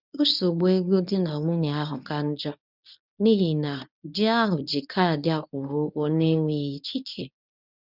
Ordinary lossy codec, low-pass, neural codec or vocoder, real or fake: none; 5.4 kHz; codec, 24 kHz, 0.9 kbps, WavTokenizer, medium speech release version 1; fake